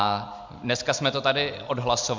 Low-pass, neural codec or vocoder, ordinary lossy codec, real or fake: 7.2 kHz; none; MP3, 64 kbps; real